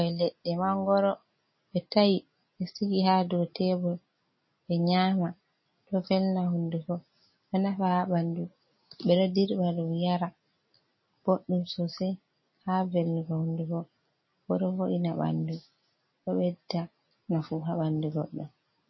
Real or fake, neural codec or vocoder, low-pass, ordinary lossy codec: real; none; 7.2 kHz; MP3, 24 kbps